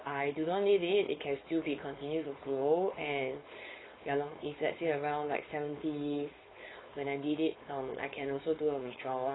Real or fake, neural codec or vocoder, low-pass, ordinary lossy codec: fake; codec, 16 kHz, 4.8 kbps, FACodec; 7.2 kHz; AAC, 16 kbps